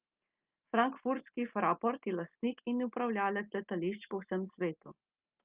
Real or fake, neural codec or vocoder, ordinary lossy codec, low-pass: real; none; Opus, 24 kbps; 3.6 kHz